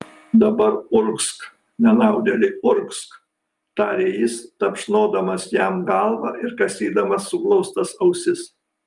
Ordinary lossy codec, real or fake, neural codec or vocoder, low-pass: Opus, 32 kbps; real; none; 10.8 kHz